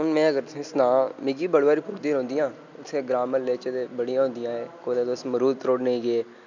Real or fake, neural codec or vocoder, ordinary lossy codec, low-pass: real; none; none; 7.2 kHz